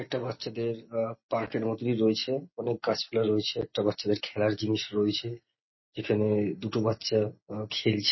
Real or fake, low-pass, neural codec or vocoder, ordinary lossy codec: real; 7.2 kHz; none; MP3, 24 kbps